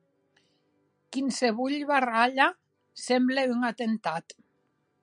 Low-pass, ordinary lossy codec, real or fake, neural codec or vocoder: 9.9 kHz; MP3, 96 kbps; real; none